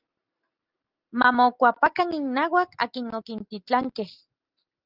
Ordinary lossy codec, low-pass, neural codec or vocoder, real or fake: Opus, 32 kbps; 5.4 kHz; none; real